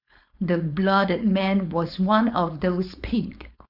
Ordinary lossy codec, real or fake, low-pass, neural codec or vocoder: none; fake; 5.4 kHz; codec, 16 kHz, 4.8 kbps, FACodec